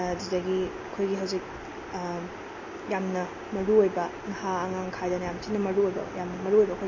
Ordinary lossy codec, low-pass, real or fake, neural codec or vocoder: MP3, 32 kbps; 7.2 kHz; real; none